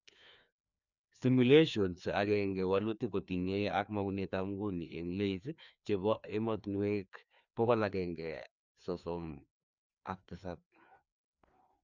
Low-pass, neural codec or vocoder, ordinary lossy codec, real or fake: 7.2 kHz; codec, 16 kHz, 2 kbps, FreqCodec, larger model; none; fake